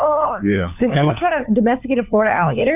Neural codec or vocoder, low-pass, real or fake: codec, 16 kHz, 4 kbps, FunCodec, trained on LibriTTS, 50 frames a second; 3.6 kHz; fake